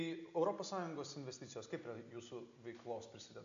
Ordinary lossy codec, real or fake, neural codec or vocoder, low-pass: AAC, 96 kbps; real; none; 7.2 kHz